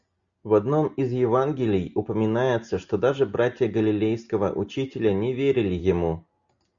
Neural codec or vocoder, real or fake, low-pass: none; real; 7.2 kHz